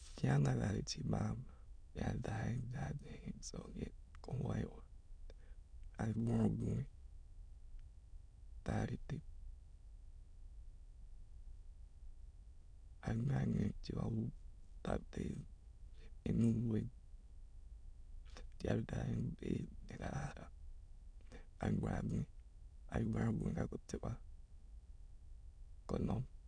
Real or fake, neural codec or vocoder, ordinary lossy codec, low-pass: fake; autoencoder, 22.05 kHz, a latent of 192 numbers a frame, VITS, trained on many speakers; none; 9.9 kHz